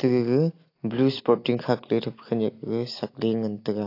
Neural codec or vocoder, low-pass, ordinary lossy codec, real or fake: none; 5.4 kHz; AAC, 32 kbps; real